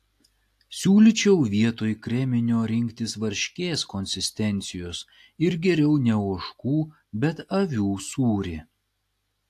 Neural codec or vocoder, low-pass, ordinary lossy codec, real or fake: none; 14.4 kHz; AAC, 64 kbps; real